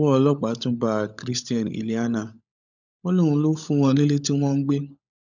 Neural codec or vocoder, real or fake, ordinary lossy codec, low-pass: codec, 16 kHz, 16 kbps, FunCodec, trained on LibriTTS, 50 frames a second; fake; none; 7.2 kHz